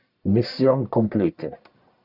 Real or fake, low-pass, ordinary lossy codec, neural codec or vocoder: fake; 5.4 kHz; Opus, 64 kbps; codec, 44.1 kHz, 1.7 kbps, Pupu-Codec